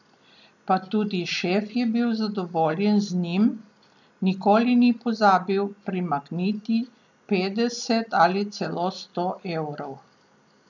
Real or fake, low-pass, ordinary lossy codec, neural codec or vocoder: real; 7.2 kHz; none; none